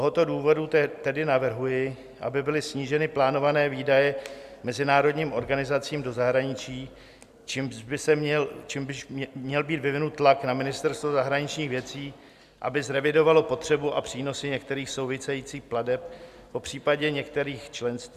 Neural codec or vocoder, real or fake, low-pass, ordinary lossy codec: none; real; 14.4 kHz; Opus, 64 kbps